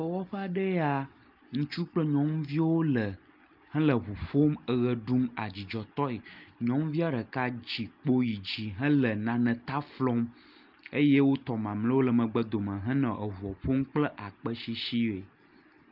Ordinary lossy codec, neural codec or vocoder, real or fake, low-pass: Opus, 32 kbps; none; real; 5.4 kHz